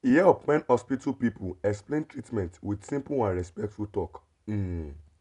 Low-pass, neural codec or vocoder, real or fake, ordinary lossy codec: 10.8 kHz; none; real; none